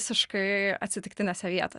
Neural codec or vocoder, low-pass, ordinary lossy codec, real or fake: none; 10.8 kHz; Opus, 64 kbps; real